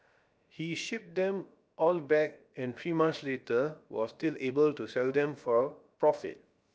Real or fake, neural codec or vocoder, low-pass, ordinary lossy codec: fake; codec, 16 kHz, 0.7 kbps, FocalCodec; none; none